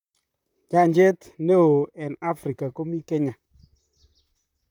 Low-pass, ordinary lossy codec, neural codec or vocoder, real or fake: 19.8 kHz; none; vocoder, 44.1 kHz, 128 mel bands, Pupu-Vocoder; fake